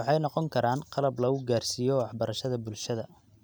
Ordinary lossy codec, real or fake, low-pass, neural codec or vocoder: none; real; none; none